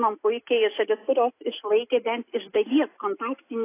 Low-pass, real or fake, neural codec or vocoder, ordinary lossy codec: 3.6 kHz; real; none; AAC, 24 kbps